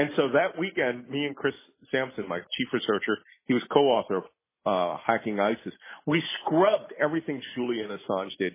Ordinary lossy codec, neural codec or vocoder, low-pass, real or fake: MP3, 16 kbps; none; 3.6 kHz; real